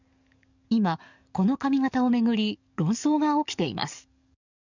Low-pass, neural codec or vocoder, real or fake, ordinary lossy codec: 7.2 kHz; codec, 44.1 kHz, 7.8 kbps, DAC; fake; none